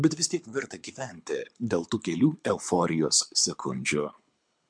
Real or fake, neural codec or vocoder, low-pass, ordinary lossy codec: fake; codec, 24 kHz, 6 kbps, HILCodec; 9.9 kHz; MP3, 64 kbps